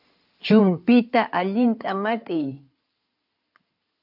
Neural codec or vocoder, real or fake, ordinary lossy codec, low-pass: codec, 16 kHz in and 24 kHz out, 2.2 kbps, FireRedTTS-2 codec; fake; AAC, 48 kbps; 5.4 kHz